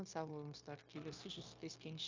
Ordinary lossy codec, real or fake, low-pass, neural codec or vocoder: MP3, 48 kbps; fake; 7.2 kHz; codec, 16 kHz, 0.9 kbps, LongCat-Audio-Codec